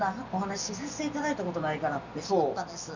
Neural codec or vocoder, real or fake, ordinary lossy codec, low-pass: none; real; none; 7.2 kHz